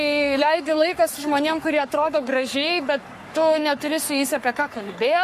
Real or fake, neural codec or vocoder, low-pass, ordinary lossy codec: fake; codec, 44.1 kHz, 3.4 kbps, Pupu-Codec; 14.4 kHz; MP3, 64 kbps